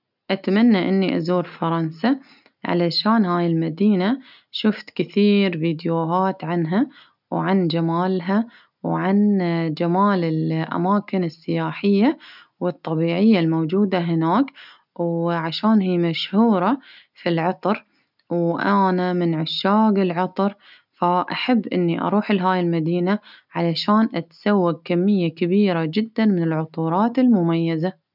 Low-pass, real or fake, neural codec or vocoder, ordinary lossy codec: 5.4 kHz; real; none; none